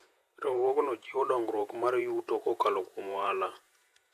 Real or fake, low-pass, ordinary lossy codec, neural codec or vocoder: fake; 14.4 kHz; AAC, 64 kbps; vocoder, 48 kHz, 128 mel bands, Vocos